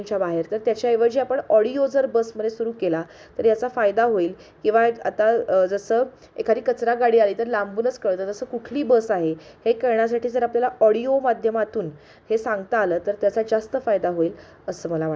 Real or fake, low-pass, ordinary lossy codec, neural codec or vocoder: real; none; none; none